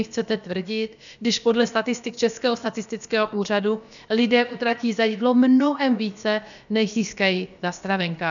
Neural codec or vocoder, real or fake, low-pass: codec, 16 kHz, about 1 kbps, DyCAST, with the encoder's durations; fake; 7.2 kHz